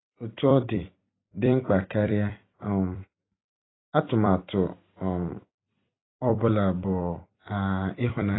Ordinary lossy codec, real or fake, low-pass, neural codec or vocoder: AAC, 16 kbps; fake; 7.2 kHz; vocoder, 44.1 kHz, 128 mel bands every 256 samples, BigVGAN v2